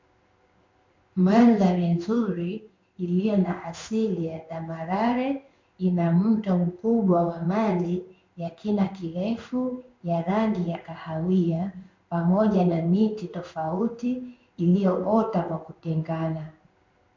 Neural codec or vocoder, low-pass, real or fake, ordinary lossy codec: codec, 16 kHz in and 24 kHz out, 1 kbps, XY-Tokenizer; 7.2 kHz; fake; MP3, 48 kbps